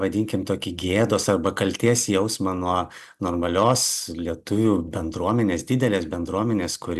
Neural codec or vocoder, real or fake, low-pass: none; real; 14.4 kHz